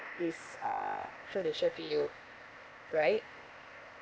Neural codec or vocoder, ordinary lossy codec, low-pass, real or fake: codec, 16 kHz, 0.8 kbps, ZipCodec; none; none; fake